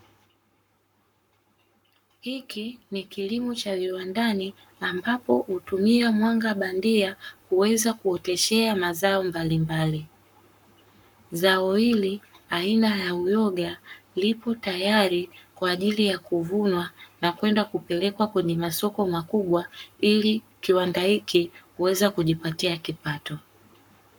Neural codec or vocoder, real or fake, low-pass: codec, 44.1 kHz, 7.8 kbps, Pupu-Codec; fake; 19.8 kHz